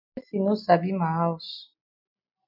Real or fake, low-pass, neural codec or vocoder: real; 5.4 kHz; none